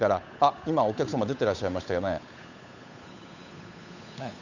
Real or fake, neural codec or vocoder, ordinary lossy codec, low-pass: fake; codec, 16 kHz, 8 kbps, FunCodec, trained on Chinese and English, 25 frames a second; none; 7.2 kHz